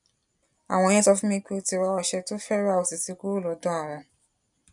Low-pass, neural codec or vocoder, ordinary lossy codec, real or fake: 10.8 kHz; none; none; real